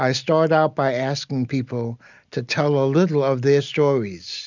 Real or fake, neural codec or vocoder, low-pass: real; none; 7.2 kHz